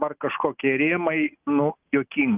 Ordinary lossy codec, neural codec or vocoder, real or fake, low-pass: Opus, 32 kbps; none; real; 3.6 kHz